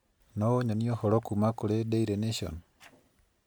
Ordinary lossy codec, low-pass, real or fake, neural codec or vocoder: none; none; real; none